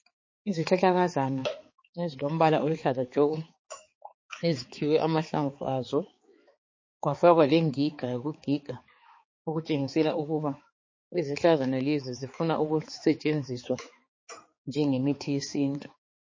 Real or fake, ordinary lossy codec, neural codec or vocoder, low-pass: fake; MP3, 32 kbps; codec, 16 kHz, 4 kbps, X-Codec, HuBERT features, trained on balanced general audio; 7.2 kHz